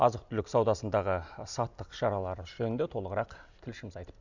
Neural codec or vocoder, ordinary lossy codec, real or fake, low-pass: none; none; real; 7.2 kHz